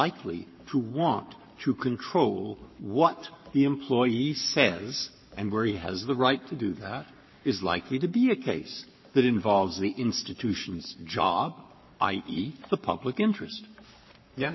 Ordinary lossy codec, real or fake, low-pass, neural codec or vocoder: MP3, 24 kbps; fake; 7.2 kHz; codec, 44.1 kHz, 7.8 kbps, Pupu-Codec